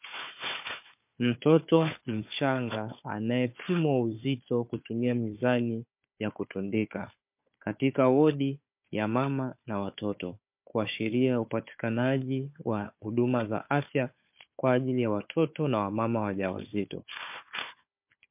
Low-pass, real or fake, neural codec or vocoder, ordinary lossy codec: 3.6 kHz; fake; codec, 16 kHz, 4 kbps, FunCodec, trained on Chinese and English, 50 frames a second; MP3, 32 kbps